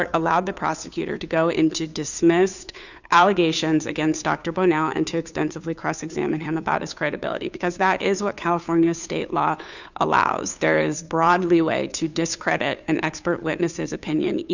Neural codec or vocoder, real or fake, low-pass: codec, 16 kHz, 2 kbps, FunCodec, trained on Chinese and English, 25 frames a second; fake; 7.2 kHz